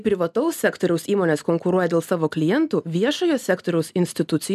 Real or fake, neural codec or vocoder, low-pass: real; none; 14.4 kHz